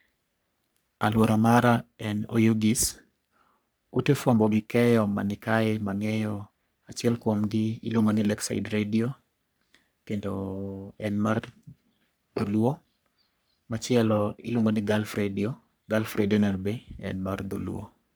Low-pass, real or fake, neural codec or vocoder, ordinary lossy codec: none; fake; codec, 44.1 kHz, 3.4 kbps, Pupu-Codec; none